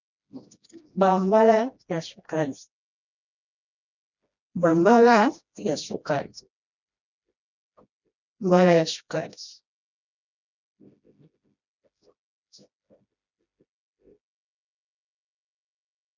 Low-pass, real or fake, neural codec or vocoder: 7.2 kHz; fake; codec, 16 kHz, 1 kbps, FreqCodec, smaller model